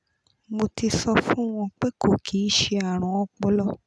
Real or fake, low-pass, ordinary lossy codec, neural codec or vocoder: real; none; none; none